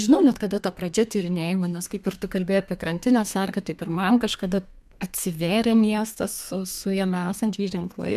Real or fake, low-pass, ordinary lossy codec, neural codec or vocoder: fake; 14.4 kHz; MP3, 96 kbps; codec, 32 kHz, 1.9 kbps, SNAC